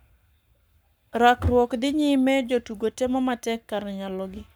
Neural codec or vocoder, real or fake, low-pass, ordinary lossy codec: codec, 44.1 kHz, 7.8 kbps, Pupu-Codec; fake; none; none